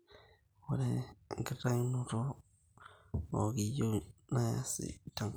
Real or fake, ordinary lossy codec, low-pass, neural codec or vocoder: real; none; none; none